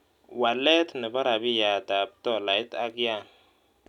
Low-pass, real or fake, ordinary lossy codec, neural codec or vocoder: 19.8 kHz; real; none; none